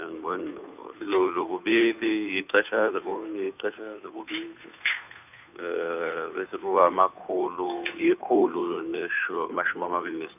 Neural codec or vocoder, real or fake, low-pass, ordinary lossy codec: codec, 16 kHz, 2 kbps, FunCodec, trained on Chinese and English, 25 frames a second; fake; 3.6 kHz; none